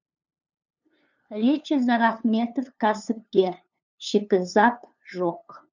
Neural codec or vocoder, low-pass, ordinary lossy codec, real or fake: codec, 16 kHz, 2 kbps, FunCodec, trained on LibriTTS, 25 frames a second; 7.2 kHz; none; fake